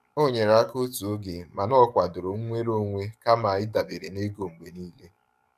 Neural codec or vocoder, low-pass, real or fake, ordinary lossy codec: codec, 44.1 kHz, 7.8 kbps, DAC; 14.4 kHz; fake; none